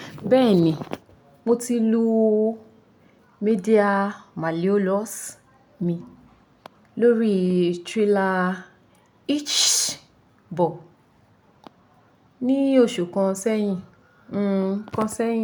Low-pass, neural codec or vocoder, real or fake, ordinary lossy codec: none; none; real; none